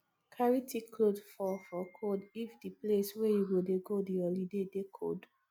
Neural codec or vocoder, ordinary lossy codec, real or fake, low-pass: none; none; real; none